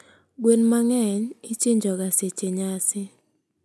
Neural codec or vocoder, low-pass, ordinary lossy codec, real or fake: none; none; none; real